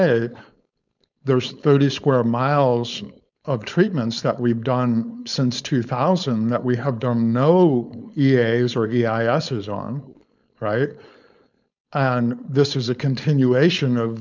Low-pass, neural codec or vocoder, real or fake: 7.2 kHz; codec, 16 kHz, 4.8 kbps, FACodec; fake